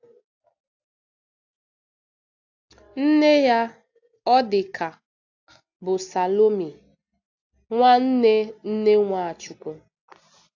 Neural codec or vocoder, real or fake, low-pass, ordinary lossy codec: none; real; 7.2 kHz; AAC, 48 kbps